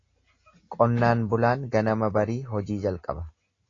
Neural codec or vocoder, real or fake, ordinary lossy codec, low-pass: none; real; AAC, 32 kbps; 7.2 kHz